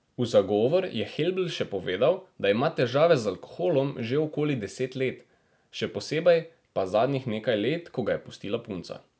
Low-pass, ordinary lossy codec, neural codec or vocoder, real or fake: none; none; none; real